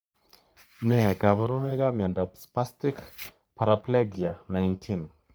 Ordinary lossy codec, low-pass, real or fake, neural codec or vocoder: none; none; fake; codec, 44.1 kHz, 3.4 kbps, Pupu-Codec